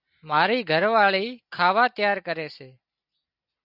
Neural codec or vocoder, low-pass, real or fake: none; 5.4 kHz; real